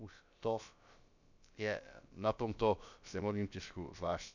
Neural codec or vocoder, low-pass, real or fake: codec, 16 kHz, about 1 kbps, DyCAST, with the encoder's durations; 7.2 kHz; fake